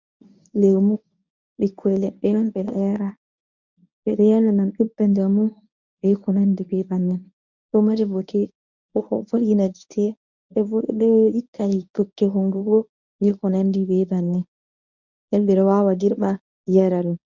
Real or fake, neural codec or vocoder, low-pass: fake; codec, 24 kHz, 0.9 kbps, WavTokenizer, medium speech release version 1; 7.2 kHz